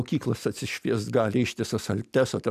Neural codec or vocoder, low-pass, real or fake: none; 14.4 kHz; real